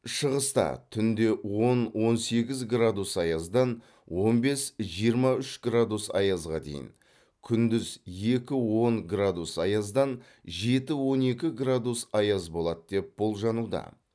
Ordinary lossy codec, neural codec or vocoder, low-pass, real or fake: none; none; none; real